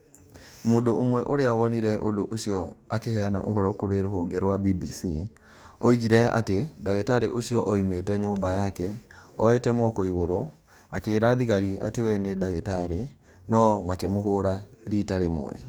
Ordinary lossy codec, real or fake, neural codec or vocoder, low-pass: none; fake; codec, 44.1 kHz, 2.6 kbps, DAC; none